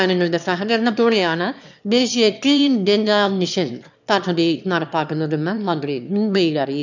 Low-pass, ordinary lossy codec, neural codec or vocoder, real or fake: 7.2 kHz; none; autoencoder, 22.05 kHz, a latent of 192 numbers a frame, VITS, trained on one speaker; fake